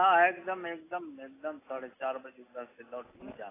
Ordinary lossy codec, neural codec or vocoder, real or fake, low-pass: AAC, 16 kbps; none; real; 3.6 kHz